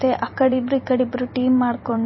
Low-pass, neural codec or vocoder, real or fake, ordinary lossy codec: 7.2 kHz; vocoder, 44.1 kHz, 128 mel bands every 256 samples, BigVGAN v2; fake; MP3, 24 kbps